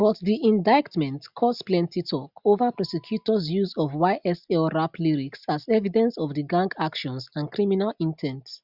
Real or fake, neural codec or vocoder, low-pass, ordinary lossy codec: real; none; 5.4 kHz; Opus, 64 kbps